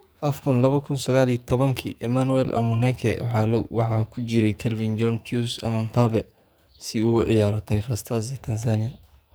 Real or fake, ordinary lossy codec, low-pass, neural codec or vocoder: fake; none; none; codec, 44.1 kHz, 2.6 kbps, SNAC